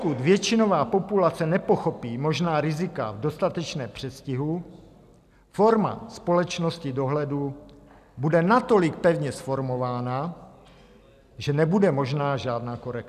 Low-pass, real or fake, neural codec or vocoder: 14.4 kHz; real; none